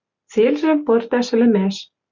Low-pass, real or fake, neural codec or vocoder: 7.2 kHz; real; none